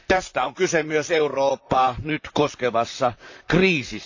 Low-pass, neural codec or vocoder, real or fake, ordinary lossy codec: 7.2 kHz; vocoder, 44.1 kHz, 128 mel bands, Pupu-Vocoder; fake; AAC, 48 kbps